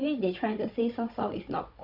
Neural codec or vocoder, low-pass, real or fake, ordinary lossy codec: codec, 16 kHz, 16 kbps, FunCodec, trained on Chinese and English, 50 frames a second; 5.4 kHz; fake; Opus, 64 kbps